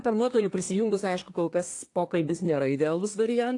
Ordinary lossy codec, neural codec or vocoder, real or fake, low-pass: AAC, 48 kbps; codec, 24 kHz, 1 kbps, SNAC; fake; 10.8 kHz